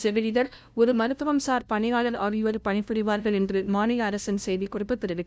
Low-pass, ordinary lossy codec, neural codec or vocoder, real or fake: none; none; codec, 16 kHz, 0.5 kbps, FunCodec, trained on LibriTTS, 25 frames a second; fake